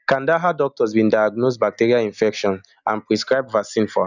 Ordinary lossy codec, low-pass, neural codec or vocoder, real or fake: none; 7.2 kHz; none; real